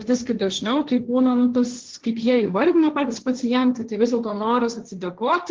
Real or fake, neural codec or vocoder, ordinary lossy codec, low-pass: fake; codec, 16 kHz, 1.1 kbps, Voila-Tokenizer; Opus, 16 kbps; 7.2 kHz